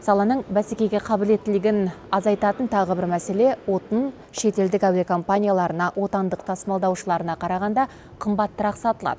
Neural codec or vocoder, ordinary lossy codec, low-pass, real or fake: none; none; none; real